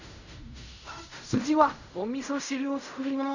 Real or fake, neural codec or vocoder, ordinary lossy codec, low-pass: fake; codec, 16 kHz in and 24 kHz out, 0.4 kbps, LongCat-Audio-Codec, fine tuned four codebook decoder; none; 7.2 kHz